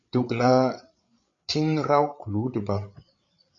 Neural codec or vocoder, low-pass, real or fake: codec, 16 kHz, 8 kbps, FreqCodec, larger model; 7.2 kHz; fake